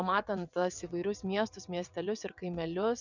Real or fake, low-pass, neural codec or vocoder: fake; 7.2 kHz; vocoder, 22.05 kHz, 80 mel bands, WaveNeXt